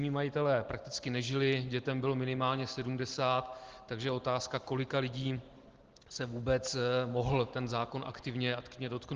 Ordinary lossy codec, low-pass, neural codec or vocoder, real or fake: Opus, 16 kbps; 7.2 kHz; none; real